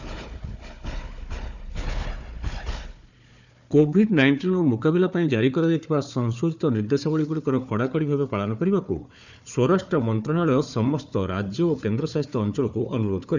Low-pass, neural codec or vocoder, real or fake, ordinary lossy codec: 7.2 kHz; codec, 16 kHz, 4 kbps, FunCodec, trained on Chinese and English, 50 frames a second; fake; none